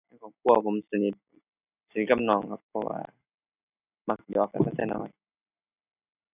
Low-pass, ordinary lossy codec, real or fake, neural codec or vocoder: 3.6 kHz; none; real; none